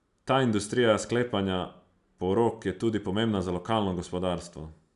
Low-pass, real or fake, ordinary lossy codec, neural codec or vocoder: 10.8 kHz; real; none; none